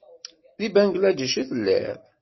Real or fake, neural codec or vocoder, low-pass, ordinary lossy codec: real; none; 7.2 kHz; MP3, 24 kbps